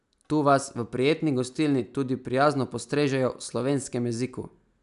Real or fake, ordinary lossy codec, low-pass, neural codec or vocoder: real; none; 10.8 kHz; none